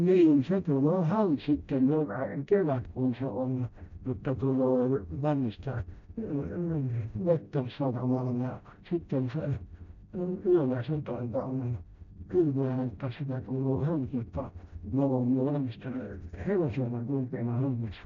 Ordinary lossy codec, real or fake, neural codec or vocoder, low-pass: none; fake; codec, 16 kHz, 0.5 kbps, FreqCodec, smaller model; 7.2 kHz